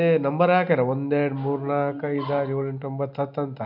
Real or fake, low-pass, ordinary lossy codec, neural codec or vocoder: real; 5.4 kHz; none; none